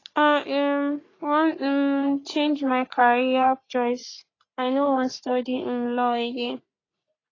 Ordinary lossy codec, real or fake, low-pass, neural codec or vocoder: AAC, 32 kbps; fake; 7.2 kHz; codec, 44.1 kHz, 3.4 kbps, Pupu-Codec